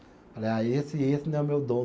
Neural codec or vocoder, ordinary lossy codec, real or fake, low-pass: none; none; real; none